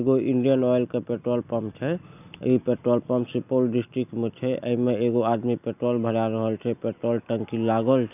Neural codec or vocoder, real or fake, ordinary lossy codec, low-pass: none; real; none; 3.6 kHz